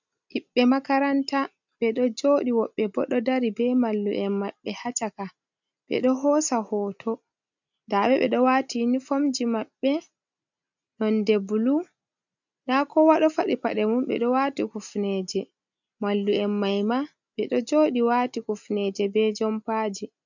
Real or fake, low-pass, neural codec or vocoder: real; 7.2 kHz; none